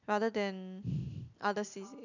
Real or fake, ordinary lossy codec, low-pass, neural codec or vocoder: real; none; 7.2 kHz; none